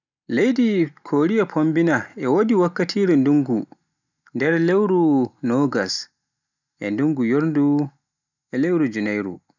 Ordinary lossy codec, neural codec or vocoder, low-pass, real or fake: none; none; 7.2 kHz; real